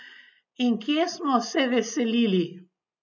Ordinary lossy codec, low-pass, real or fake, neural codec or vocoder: none; 7.2 kHz; real; none